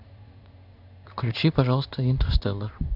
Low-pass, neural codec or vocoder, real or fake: 5.4 kHz; codec, 16 kHz in and 24 kHz out, 1 kbps, XY-Tokenizer; fake